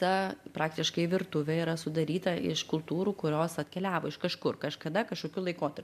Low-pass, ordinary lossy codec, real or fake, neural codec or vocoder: 14.4 kHz; MP3, 96 kbps; real; none